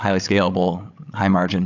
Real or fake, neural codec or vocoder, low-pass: fake; codec, 16 kHz, 16 kbps, FreqCodec, larger model; 7.2 kHz